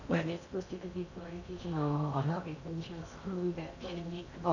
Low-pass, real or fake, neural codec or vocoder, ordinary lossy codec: 7.2 kHz; fake; codec, 16 kHz in and 24 kHz out, 0.6 kbps, FocalCodec, streaming, 4096 codes; none